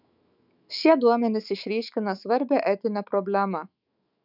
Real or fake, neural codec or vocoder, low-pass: fake; autoencoder, 48 kHz, 128 numbers a frame, DAC-VAE, trained on Japanese speech; 5.4 kHz